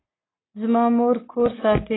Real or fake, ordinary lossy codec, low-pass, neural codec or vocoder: real; AAC, 16 kbps; 7.2 kHz; none